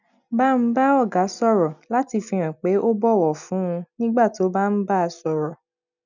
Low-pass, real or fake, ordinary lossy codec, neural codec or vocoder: 7.2 kHz; real; none; none